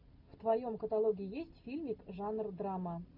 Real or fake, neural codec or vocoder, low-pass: real; none; 5.4 kHz